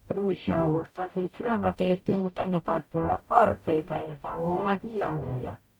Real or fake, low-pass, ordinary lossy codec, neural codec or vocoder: fake; 19.8 kHz; none; codec, 44.1 kHz, 0.9 kbps, DAC